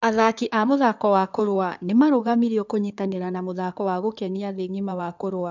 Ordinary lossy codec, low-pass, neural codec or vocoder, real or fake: none; 7.2 kHz; codec, 16 kHz in and 24 kHz out, 2.2 kbps, FireRedTTS-2 codec; fake